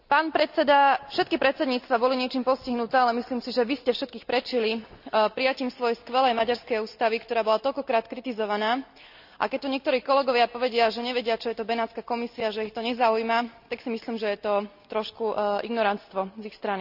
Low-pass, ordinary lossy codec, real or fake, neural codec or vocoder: 5.4 kHz; none; real; none